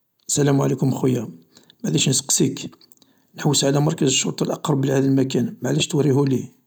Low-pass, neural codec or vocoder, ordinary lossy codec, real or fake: none; none; none; real